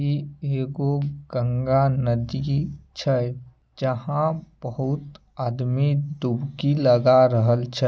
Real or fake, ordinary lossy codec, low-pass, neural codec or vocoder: real; none; none; none